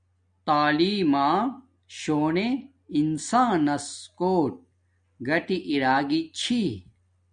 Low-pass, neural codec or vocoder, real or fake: 9.9 kHz; none; real